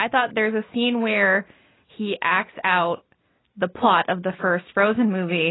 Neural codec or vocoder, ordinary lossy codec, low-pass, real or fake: none; AAC, 16 kbps; 7.2 kHz; real